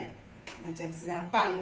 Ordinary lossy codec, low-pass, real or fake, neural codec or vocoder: none; none; fake; codec, 16 kHz, 2 kbps, FunCodec, trained on Chinese and English, 25 frames a second